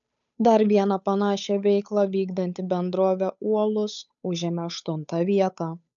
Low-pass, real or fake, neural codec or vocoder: 7.2 kHz; fake; codec, 16 kHz, 8 kbps, FunCodec, trained on Chinese and English, 25 frames a second